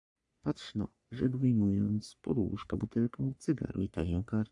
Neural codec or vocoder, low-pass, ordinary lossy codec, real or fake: codec, 44.1 kHz, 3.4 kbps, Pupu-Codec; 10.8 kHz; MP3, 96 kbps; fake